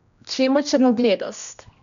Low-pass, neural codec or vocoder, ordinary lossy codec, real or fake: 7.2 kHz; codec, 16 kHz, 1 kbps, X-Codec, HuBERT features, trained on general audio; none; fake